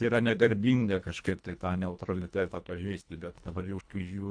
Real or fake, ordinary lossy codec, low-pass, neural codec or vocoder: fake; AAC, 64 kbps; 9.9 kHz; codec, 24 kHz, 1.5 kbps, HILCodec